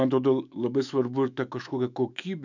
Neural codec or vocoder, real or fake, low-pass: autoencoder, 48 kHz, 128 numbers a frame, DAC-VAE, trained on Japanese speech; fake; 7.2 kHz